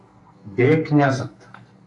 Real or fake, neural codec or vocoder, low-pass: fake; codec, 44.1 kHz, 2.6 kbps, SNAC; 10.8 kHz